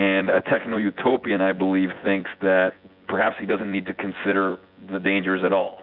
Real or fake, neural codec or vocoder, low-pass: fake; vocoder, 24 kHz, 100 mel bands, Vocos; 5.4 kHz